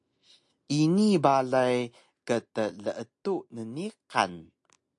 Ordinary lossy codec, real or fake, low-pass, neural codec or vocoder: AAC, 64 kbps; real; 10.8 kHz; none